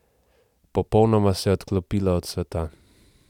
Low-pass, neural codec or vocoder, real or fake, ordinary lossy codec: 19.8 kHz; none; real; none